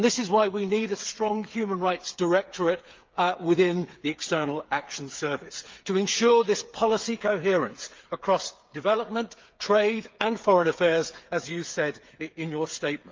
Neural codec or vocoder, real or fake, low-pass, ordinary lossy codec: codec, 16 kHz, 8 kbps, FreqCodec, smaller model; fake; 7.2 kHz; Opus, 24 kbps